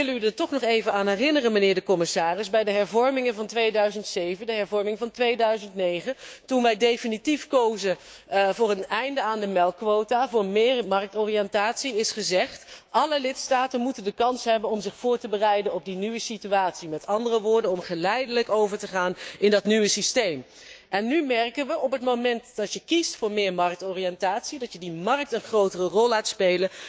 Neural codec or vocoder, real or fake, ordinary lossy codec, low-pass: codec, 16 kHz, 6 kbps, DAC; fake; none; none